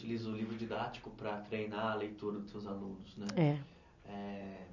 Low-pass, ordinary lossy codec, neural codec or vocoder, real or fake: 7.2 kHz; none; none; real